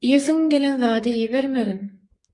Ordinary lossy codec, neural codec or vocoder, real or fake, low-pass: MP3, 48 kbps; codec, 32 kHz, 1.9 kbps, SNAC; fake; 10.8 kHz